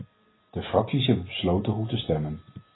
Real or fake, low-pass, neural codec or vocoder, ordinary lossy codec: real; 7.2 kHz; none; AAC, 16 kbps